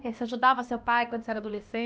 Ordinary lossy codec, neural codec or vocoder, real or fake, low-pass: none; codec, 16 kHz, 1 kbps, X-Codec, HuBERT features, trained on LibriSpeech; fake; none